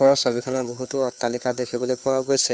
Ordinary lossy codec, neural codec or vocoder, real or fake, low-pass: none; codec, 16 kHz, 2 kbps, FunCodec, trained on Chinese and English, 25 frames a second; fake; none